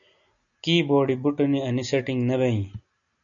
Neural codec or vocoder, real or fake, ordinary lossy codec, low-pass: none; real; MP3, 96 kbps; 7.2 kHz